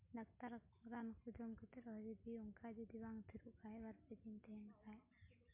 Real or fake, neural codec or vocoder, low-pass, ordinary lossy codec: real; none; 3.6 kHz; MP3, 32 kbps